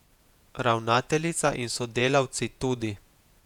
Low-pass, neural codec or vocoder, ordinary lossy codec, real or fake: 19.8 kHz; vocoder, 48 kHz, 128 mel bands, Vocos; none; fake